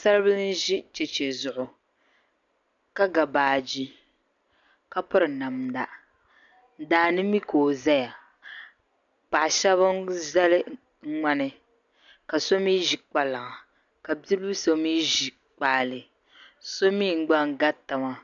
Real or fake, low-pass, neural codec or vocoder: real; 7.2 kHz; none